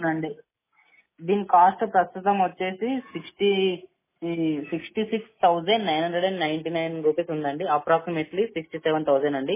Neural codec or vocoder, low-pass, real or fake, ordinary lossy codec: none; 3.6 kHz; real; MP3, 16 kbps